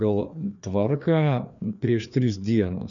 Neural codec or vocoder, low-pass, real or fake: codec, 16 kHz, 2 kbps, FreqCodec, larger model; 7.2 kHz; fake